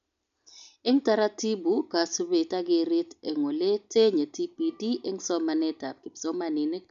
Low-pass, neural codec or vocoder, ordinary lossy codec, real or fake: 7.2 kHz; none; none; real